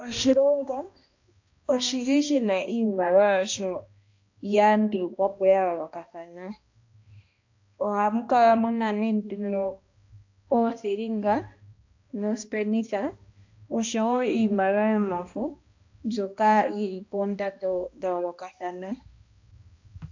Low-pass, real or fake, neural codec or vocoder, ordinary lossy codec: 7.2 kHz; fake; codec, 16 kHz, 1 kbps, X-Codec, HuBERT features, trained on balanced general audio; AAC, 48 kbps